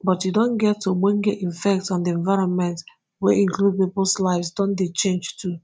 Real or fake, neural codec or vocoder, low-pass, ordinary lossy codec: real; none; none; none